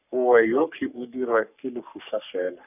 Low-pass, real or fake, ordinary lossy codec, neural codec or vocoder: 3.6 kHz; fake; none; codec, 44.1 kHz, 3.4 kbps, Pupu-Codec